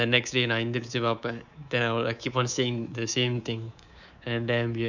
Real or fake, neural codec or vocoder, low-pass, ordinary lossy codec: fake; codec, 24 kHz, 3.1 kbps, DualCodec; 7.2 kHz; none